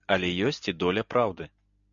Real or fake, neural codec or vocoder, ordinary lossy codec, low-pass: real; none; MP3, 96 kbps; 7.2 kHz